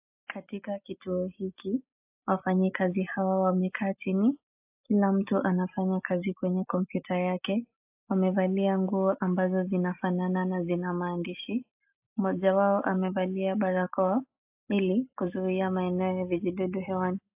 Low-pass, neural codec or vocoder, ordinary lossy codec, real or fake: 3.6 kHz; none; AAC, 32 kbps; real